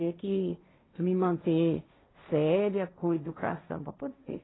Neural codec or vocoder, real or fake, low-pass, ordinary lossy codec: codec, 16 kHz, 1.1 kbps, Voila-Tokenizer; fake; 7.2 kHz; AAC, 16 kbps